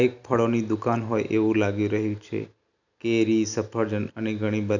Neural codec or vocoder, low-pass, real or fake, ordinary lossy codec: none; 7.2 kHz; real; none